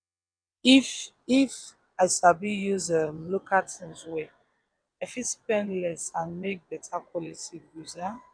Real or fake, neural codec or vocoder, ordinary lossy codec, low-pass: fake; vocoder, 22.05 kHz, 80 mel bands, WaveNeXt; none; 9.9 kHz